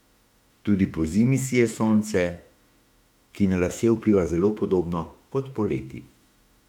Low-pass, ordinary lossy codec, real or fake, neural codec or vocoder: 19.8 kHz; MP3, 96 kbps; fake; autoencoder, 48 kHz, 32 numbers a frame, DAC-VAE, trained on Japanese speech